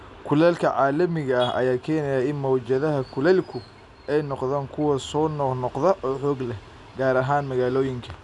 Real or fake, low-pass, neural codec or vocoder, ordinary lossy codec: real; 10.8 kHz; none; none